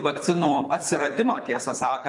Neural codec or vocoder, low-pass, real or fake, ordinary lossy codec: codec, 24 kHz, 3 kbps, HILCodec; 10.8 kHz; fake; MP3, 64 kbps